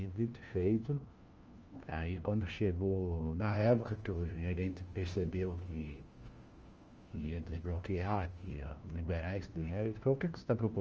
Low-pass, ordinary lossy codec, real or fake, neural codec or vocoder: 7.2 kHz; Opus, 24 kbps; fake; codec, 16 kHz, 1 kbps, FunCodec, trained on LibriTTS, 50 frames a second